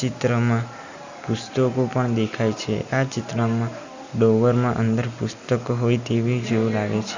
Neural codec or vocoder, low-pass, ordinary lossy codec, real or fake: none; 7.2 kHz; Opus, 64 kbps; real